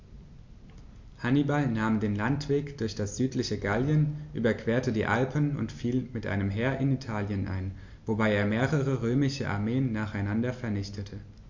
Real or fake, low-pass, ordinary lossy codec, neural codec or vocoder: real; 7.2 kHz; MP3, 48 kbps; none